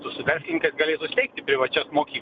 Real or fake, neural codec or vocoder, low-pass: real; none; 7.2 kHz